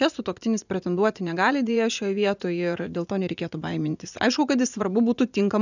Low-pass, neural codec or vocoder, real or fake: 7.2 kHz; vocoder, 44.1 kHz, 80 mel bands, Vocos; fake